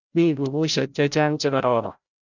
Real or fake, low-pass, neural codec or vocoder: fake; 7.2 kHz; codec, 16 kHz, 0.5 kbps, FreqCodec, larger model